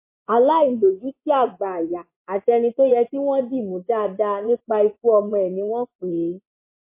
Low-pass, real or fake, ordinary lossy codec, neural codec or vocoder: 3.6 kHz; fake; MP3, 24 kbps; vocoder, 24 kHz, 100 mel bands, Vocos